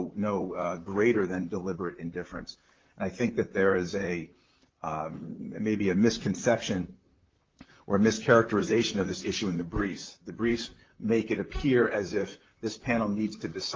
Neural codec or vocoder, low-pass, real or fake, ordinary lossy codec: vocoder, 44.1 kHz, 128 mel bands, Pupu-Vocoder; 7.2 kHz; fake; Opus, 32 kbps